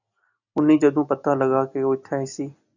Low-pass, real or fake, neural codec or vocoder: 7.2 kHz; real; none